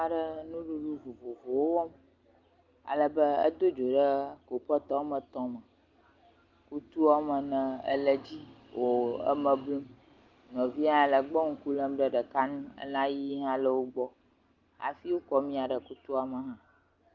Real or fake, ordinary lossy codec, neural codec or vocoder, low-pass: real; Opus, 32 kbps; none; 7.2 kHz